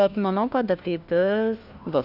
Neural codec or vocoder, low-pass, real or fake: codec, 16 kHz, 1 kbps, FunCodec, trained on LibriTTS, 50 frames a second; 5.4 kHz; fake